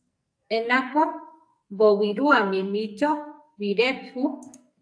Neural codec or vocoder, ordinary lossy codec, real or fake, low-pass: codec, 44.1 kHz, 2.6 kbps, SNAC; AAC, 64 kbps; fake; 9.9 kHz